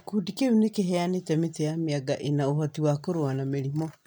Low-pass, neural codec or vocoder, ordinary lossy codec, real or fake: 19.8 kHz; none; none; real